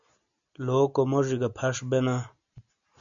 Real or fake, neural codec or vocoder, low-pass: real; none; 7.2 kHz